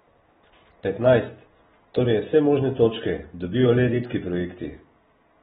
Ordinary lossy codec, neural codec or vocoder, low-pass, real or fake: AAC, 16 kbps; none; 19.8 kHz; real